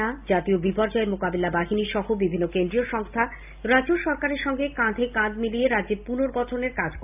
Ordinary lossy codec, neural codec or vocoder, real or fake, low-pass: AAC, 32 kbps; none; real; 3.6 kHz